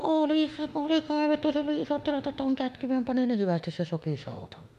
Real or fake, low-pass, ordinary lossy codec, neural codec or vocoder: fake; 14.4 kHz; MP3, 96 kbps; autoencoder, 48 kHz, 32 numbers a frame, DAC-VAE, trained on Japanese speech